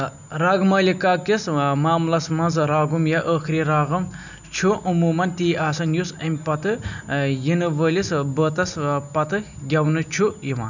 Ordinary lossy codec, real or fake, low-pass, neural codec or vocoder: none; real; 7.2 kHz; none